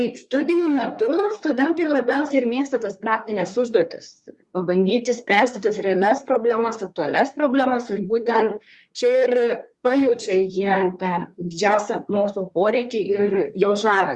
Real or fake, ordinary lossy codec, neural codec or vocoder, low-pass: fake; Opus, 64 kbps; codec, 24 kHz, 1 kbps, SNAC; 10.8 kHz